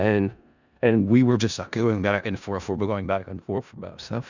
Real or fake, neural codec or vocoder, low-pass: fake; codec, 16 kHz in and 24 kHz out, 0.4 kbps, LongCat-Audio-Codec, four codebook decoder; 7.2 kHz